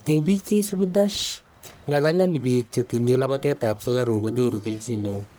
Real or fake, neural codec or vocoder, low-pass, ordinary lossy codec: fake; codec, 44.1 kHz, 1.7 kbps, Pupu-Codec; none; none